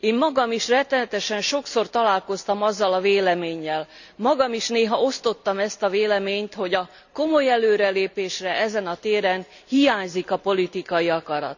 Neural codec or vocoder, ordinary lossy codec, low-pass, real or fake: none; none; 7.2 kHz; real